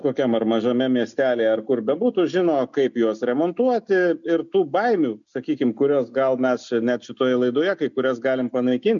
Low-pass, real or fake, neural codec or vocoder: 7.2 kHz; real; none